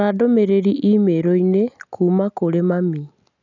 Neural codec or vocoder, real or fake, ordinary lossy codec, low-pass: none; real; none; 7.2 kHz